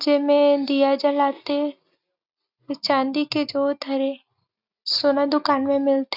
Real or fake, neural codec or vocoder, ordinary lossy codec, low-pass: real; none; Opus, 64 kbps; 5.4 kHz